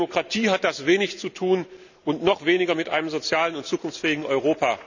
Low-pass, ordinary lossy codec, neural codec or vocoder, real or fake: 7.2 kHz; none; none; real